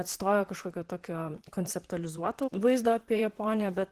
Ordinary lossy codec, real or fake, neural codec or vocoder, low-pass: Opus, 16 kbps; fake; vocoder, 44.1 kHz, 128 mel bands, Pupu-Vocoder; 14.4 kHz